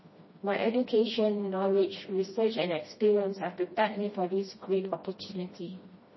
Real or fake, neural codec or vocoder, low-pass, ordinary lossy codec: fake; codec, 16 kHz, 1 kbps, FreqCodec, smaller model; 7.2 kHz; MP3, 24 kbps